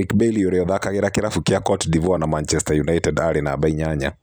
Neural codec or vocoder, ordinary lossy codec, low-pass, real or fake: none; none; none; real